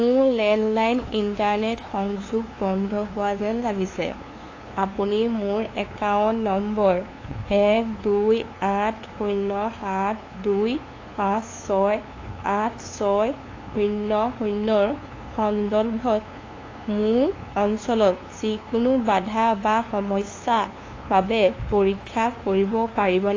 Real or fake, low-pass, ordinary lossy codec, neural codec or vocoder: fake; 7.2 kHz; AAC, 32 kbps; codec, 16 kHz, 2 kbps, FunCodec, trained on LibriTTS, 25 frames a second